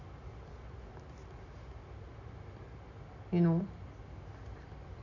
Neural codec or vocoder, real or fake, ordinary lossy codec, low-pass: none; real; none; 7.2 kHz